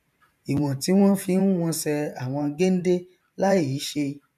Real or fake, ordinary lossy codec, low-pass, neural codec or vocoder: fake; none; 14.4 kHz; vocoder, 44.1 kHz, 128 mel bands every 512 samples, BigVGAN v2